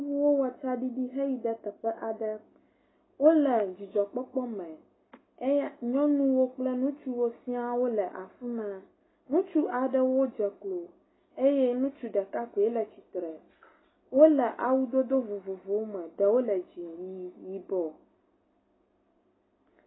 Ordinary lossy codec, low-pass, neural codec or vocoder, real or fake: AAC, 16 kbps; 7.2 kHz; none; real